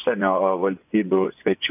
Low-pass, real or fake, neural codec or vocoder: 3.6 kHz; fake; vocoder, 24 kHz, 100 mel bands, Vocos